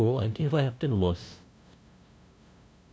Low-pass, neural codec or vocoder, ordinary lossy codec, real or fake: none; codec, 16 kHz, 0.5 kbps, FunCodec, trained on LibriTTS, 25 frames a second; none; fake